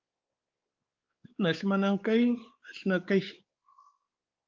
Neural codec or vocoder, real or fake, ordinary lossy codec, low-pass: codec, 16 kHz, 4 kbps, X-Codec, WavLM features, trained on Multilingual LibriSpeech; fake; Opus, 32 kbps; 7.2 kHz